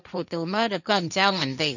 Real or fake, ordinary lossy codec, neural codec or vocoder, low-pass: fake; none; codec, 16 kHz, 1.1 kbps, Voila-Tokenizer; 7.2 kHz